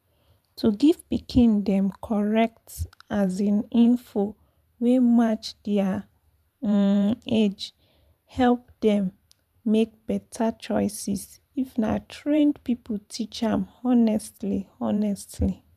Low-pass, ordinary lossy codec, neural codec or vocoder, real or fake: 14.4 kHz; none; vocoder, 44.1 kHz, 128 mel bands every 512 samples, BigVGAN v2; fake